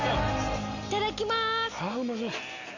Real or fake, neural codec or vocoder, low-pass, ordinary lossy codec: real; none; 7.2 kHz; none